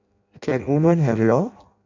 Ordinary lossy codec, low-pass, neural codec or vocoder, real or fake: none; 7.2 kHz; codec, 16 kHz in and 24 kHz out, 0.6 kbps, FireRedTTS-2 codec; fake